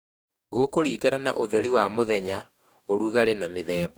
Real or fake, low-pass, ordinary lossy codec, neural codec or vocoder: fake; none; none; codec, 44.1 kHz, 2.6 kbps, DAC